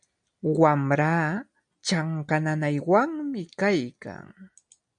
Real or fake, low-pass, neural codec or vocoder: real; 9.9 kHz; none